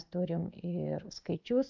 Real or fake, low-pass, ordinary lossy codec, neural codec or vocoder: real; 7.2 kHz; MP3, 64 kbps; none